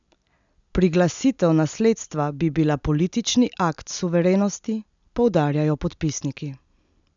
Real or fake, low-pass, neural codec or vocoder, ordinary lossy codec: real; 7.2 kHz; none; none